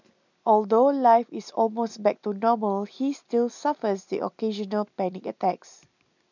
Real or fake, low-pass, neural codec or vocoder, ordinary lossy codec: real; 7.2 kHz; none; none